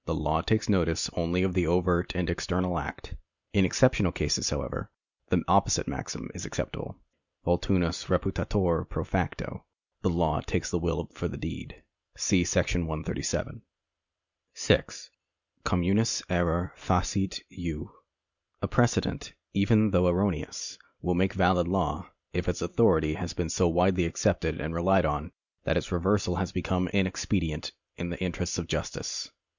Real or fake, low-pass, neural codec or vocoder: real; 7.2 kHz; none